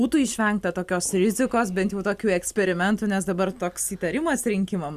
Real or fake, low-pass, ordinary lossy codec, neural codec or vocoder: real; 14.4 kHz; Opus, 64 kbps; none